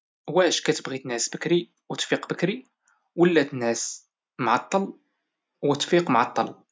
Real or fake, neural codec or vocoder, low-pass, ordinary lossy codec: real; none; none; none